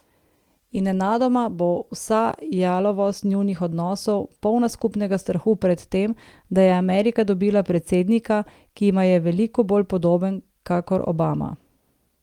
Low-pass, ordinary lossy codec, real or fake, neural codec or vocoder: 19.8 kHz; Opus, 24 kbps; real; none